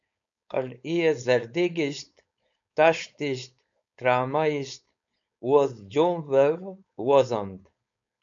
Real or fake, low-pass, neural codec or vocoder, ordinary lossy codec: fake; 7.2 kHz; codec, 16 kHz, 4.8 kbps, FACodec; MP3, 64 kbps